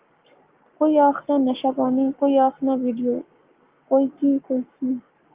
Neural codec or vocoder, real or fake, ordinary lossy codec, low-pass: codec, 44.1 kHz, 7.8 kbps, Pupu-Codec; fake; Opus, 32 kbps; 3.6 kHz